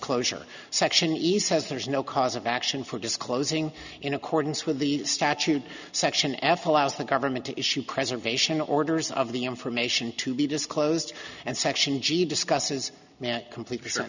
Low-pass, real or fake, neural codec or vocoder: 7.2 kHz; real; none